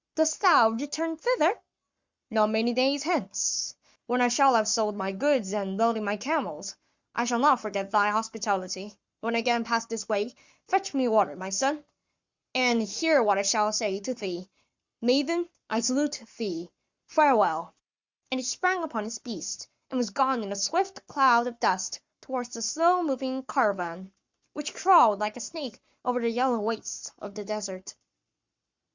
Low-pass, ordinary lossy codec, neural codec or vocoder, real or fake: 7.2 kHz; Opus, 64 kbps; codec, 44.1 kHz, 7.8 kbps, Pupu-Codec; fake